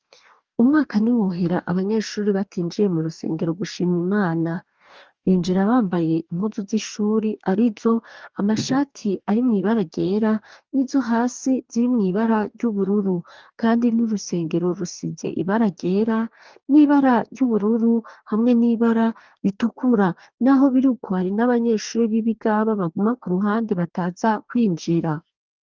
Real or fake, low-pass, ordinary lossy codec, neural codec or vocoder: fake; 7.2 kHz; Opus, 24 kbps; codec, 44.1 kHz, 2.6 kbps, DAC